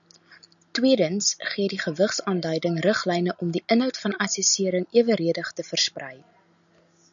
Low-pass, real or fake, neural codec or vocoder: 7.2 kHz; real; none